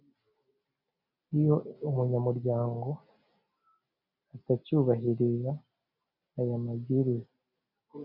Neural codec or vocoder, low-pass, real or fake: none; 5.4 kHz; real